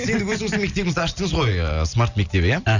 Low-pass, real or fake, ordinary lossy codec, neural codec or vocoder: 7.2 kHz; real; none; none